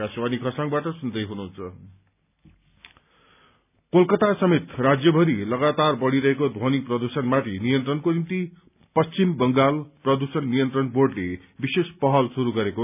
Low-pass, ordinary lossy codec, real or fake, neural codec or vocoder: 3.6 kHz; none; real; none